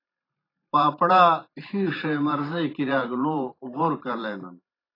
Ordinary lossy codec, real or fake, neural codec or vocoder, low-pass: AAC, 24 kbps; fake; vocoder, 44.1 kHz, 128 mel bands every 512 samples, BigVGAN v2; 5.4 kHz